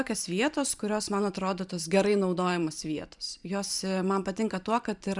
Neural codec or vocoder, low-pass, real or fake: vocoder, 44.1 kHz, 128 mel bands every 512 samples, BigVGAN v2; 10.8 kHz; fake